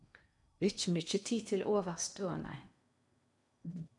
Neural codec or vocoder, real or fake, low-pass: codec, 16 kHz in and 24 kHz out, 0.8 kbps, FocalCodec, streaming, 65536 codes; fake; 10.8 kHz